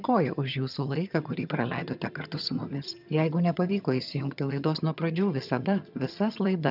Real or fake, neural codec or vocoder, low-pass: fake; vocoder, 22.05 kHz, 80 mel bands, HiFi-GAN; 5.4 kHz